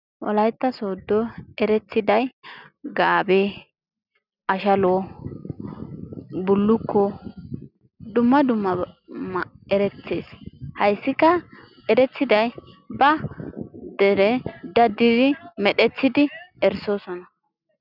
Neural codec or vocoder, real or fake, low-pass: none; real; 5.4 kHz